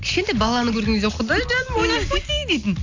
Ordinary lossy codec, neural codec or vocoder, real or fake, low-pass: AAC, 48 kbps; none; real; 7.2 kHz